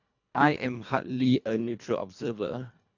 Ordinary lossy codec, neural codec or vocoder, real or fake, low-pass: none; codec, 24 kHz, 1.5 kbps, HILCodec; fake; 7.2 kHz